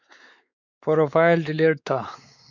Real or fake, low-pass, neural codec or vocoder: fake; 7.2 kHz; codec, 16 kHz, 4 kbps, X-Codec, WavLM features, trained on Multilingual LibriSpeech